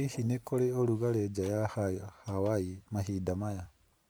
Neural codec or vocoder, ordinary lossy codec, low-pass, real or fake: vocoder, 44.1 kHz, 128 mel bands every 512 samples, BigVGAN v2; none; none; fake